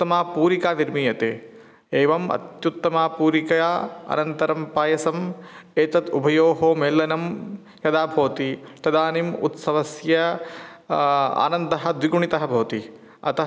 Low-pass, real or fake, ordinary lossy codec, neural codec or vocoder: none; real; none; none